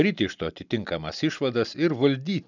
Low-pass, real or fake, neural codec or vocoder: 7.2 kHz; real; none